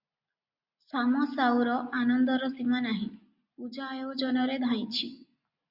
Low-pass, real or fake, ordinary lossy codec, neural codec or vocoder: 5.4 kHz; real; Opus, 64 kbps; none